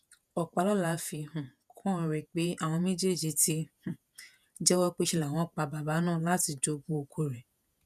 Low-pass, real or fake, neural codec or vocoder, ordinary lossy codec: 14.4 kHz; fake; vocoder, 48 kHz, 128 mel bands, Vocos; none